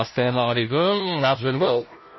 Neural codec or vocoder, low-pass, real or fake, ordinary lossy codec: codec, 16 kHz, 0.5 kbps, FunCodec, trained on Chinese and English, 25 frames a second; 7.2 kHz; fake; MP3, 24 kbps